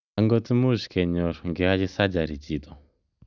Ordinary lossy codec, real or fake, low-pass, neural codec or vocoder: none; real; 7.2 kHz; none